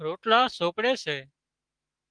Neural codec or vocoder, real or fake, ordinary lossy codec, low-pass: autoencoder, 48 kHz, 128 numbers a frame, DAC-VAE, trained on Japanese speech; fake; Opus, 24 kbps; 9.9 kHz